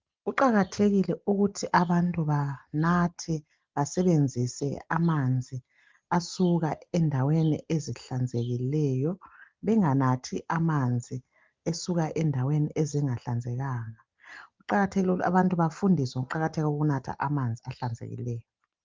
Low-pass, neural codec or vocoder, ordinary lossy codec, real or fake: 7.2 kHz; none; Opus, 32 kbps; real